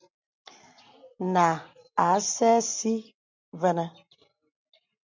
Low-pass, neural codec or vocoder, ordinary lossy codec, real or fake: 7.2 kHz; none; MP3, 64 kbps; real